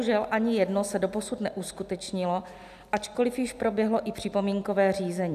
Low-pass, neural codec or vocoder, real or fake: 14.4 kHz; none; real